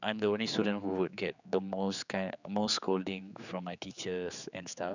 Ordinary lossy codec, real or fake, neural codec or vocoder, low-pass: none; fake; codec, 16 kHz, 4 kbps, X-Codec, HuBERT features, trained on general audio; 7.2 kHz